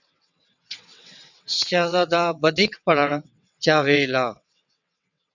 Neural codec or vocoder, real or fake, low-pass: vocoder, 22.05 kHz, 80 mel bands, WaveNeXt; fake; 7.2 kHz